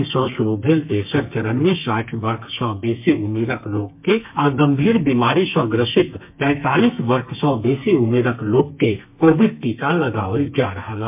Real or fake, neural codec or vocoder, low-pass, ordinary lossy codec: fake; codec, 32 kHz, 1.9 kbps, SNAC; 3.6 kHz; MP3, 32 kbps